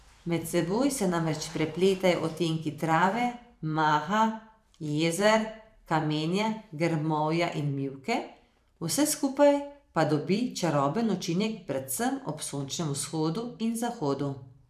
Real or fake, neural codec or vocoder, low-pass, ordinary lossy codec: fake; vocoder, 44.1 kHz, 128 mel bands every 512 samples, BigVGAN v2; 14.4 kHz; none